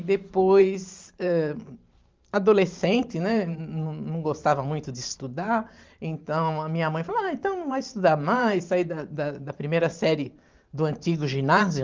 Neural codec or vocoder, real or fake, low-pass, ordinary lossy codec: vocoder, 44.1 kHz, 128 mel bands every 512 samples, BigVGAN v2; fake; 7.2 kHz; Opus, 32 kbps